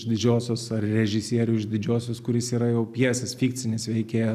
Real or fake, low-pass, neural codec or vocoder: real; 14.4 kHz; none